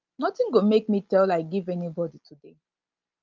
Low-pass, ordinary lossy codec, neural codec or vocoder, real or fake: 7.2 kHz; Opus, 24 kbps; none; real